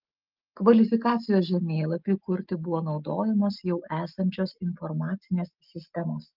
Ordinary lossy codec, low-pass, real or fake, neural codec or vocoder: Opus, 32 kbps; 5.4 kHz; real; none